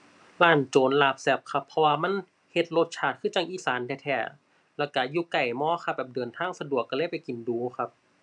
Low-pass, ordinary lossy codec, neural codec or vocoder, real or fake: 10.8 kHz; none; none; real